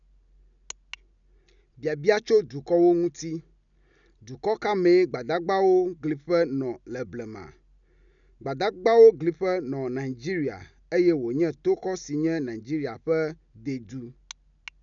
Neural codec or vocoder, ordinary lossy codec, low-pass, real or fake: none; none; 7.2 kHz; real